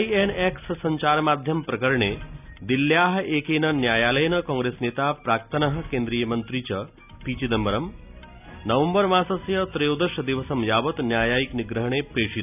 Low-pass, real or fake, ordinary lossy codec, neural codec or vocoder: 3.6 kHz; real; none; none